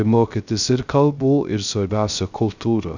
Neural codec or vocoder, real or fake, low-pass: codec, 16 kHz, 0.3 kbps, FocalCodec; fake; 7.2 kHz